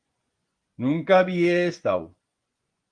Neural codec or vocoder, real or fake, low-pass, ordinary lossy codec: vocoder, 24 kHz, 100 mel bands, Vocos; fake; 9.9 kHz; Opus, 24 kbps